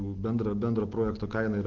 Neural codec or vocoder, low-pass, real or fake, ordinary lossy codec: none; 7.2 kHz; real; Opus, 16 kbps